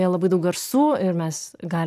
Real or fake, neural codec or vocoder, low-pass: real; none; 14.4 kHz